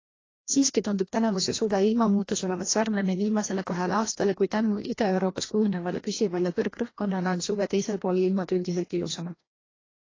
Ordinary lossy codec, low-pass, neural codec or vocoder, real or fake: AAC, 32 kbps; 7.2 kHz; codec, 16 kHz, 1 kbps, FreqCodec, larger model; fake